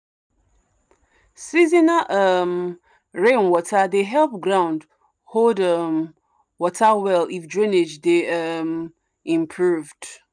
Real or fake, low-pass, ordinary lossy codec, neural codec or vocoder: real; 9.9 kHz; none; none